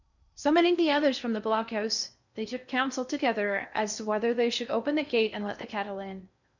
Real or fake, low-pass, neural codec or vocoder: fake; 7.2 kHz; codec, 16 kHz in and 24 kHz out, 0.6 kbps, FocalCodec, streaming, 4096 codes